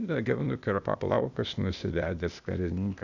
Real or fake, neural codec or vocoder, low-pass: fake; codec, 16 kHz, 0.8 kbps, ZipCodec; 7.2 kHz